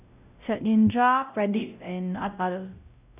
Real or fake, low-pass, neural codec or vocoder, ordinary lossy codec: fake; 3.6 kHz; codec, 16 kHz, 0.5 kbps, X-Codec, WavLM features, trained on Multilingual LibriSpeech; none